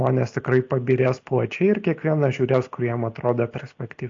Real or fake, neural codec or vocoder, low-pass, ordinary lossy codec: real; none; 7.2 kHz; AAC, 48 kbps